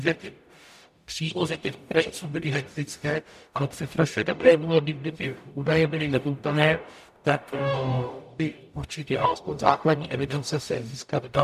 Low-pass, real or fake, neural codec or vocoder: 14.4 kHz; fake; codec, 44.1 kHz, 0.9 kbps, DAC